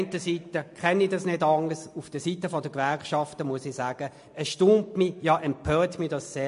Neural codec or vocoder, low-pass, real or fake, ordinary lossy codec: none; 10.8 kHz; real; MP3, 48 kbps